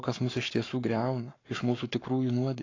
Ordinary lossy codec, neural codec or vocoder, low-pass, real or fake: AAC, 32 kbps; codec, 44.1 kHz, 7.8 kbps, Pupu-Codec; 7.2 kHz; fake